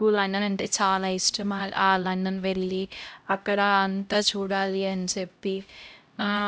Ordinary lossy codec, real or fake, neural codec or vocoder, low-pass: none; fake; codec, 16 kHz, 0.5 kbps, X-Codec, HuBERT features, trained on LibriSpeech; none